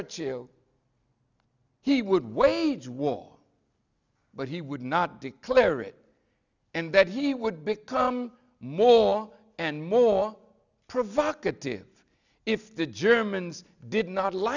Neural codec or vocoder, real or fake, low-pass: none; real; 7.2 kHz